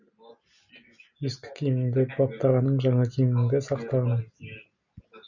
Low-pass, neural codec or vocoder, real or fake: 7.2 kHz; none; real